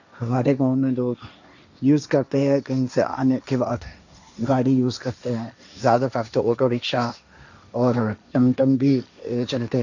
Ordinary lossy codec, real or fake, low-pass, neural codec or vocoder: none; fake; 7.2 kHz; codec, 16 kHz, 1.1 kbps, Voila-Tokenizer